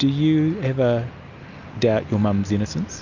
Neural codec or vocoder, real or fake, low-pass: none; real; 7.2 kHz